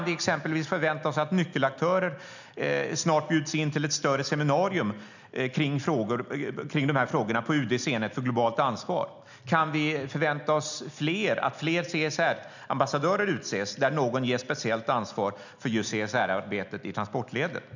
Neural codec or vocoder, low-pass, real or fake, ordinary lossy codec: none; 7.2 kHz; real; none